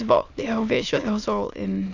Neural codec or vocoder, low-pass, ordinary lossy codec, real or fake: autoencoder, 22.05 kHz, a latent of 192 numbers a frame, VITS, trained on many speakers; 7.2 kHz; none; fake